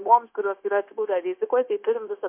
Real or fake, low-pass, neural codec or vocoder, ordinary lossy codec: fake; 3.6 kHz; codec, 16 kHz, 0.9 kbps, LongCat-Audio-Codec; MP3, 32 kbps